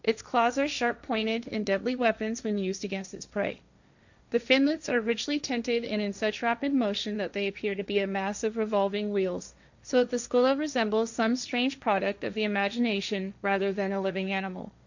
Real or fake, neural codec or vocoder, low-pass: fake; codec, 16 kHz, 1.1 kbps, Voila-Tokenizer; 7.2 kHz